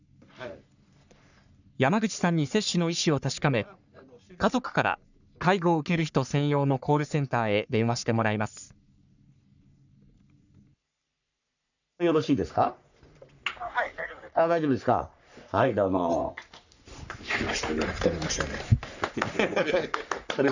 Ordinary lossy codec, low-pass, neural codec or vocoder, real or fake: none; 7.2 kHz; codec, 44.1 kHz, 3.4 kbps, Pupu-Codec; fake